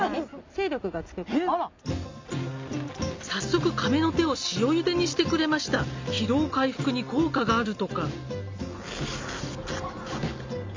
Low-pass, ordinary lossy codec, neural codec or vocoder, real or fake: 7.2 kHz; MP3, 64 kbps; none; real